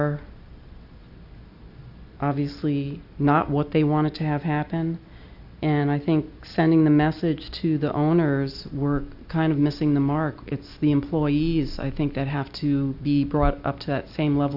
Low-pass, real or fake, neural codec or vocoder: 5.4 kHz; real; none